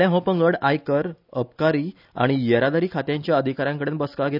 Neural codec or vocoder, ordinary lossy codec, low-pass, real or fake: none; none; 5.4 kHz; real